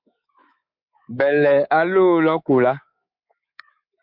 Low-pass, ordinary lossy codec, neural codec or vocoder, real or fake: 5.4 kHz; MP3, 48 kbps; codec, 24 kHz, 3.1 kbps, DualCodec; fake